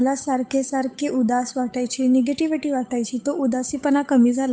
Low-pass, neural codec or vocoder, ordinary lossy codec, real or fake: none; codec, 16 kHz, 8 kbps, FunCodec, trained on Chinese and English, 25 frames a second; none; fake